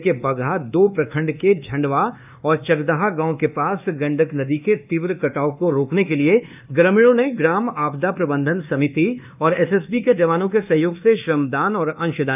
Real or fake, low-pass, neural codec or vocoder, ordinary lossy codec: fake; 3.6 kHz; codec, 24 kHz, 1.2 kbps, DualCodec; none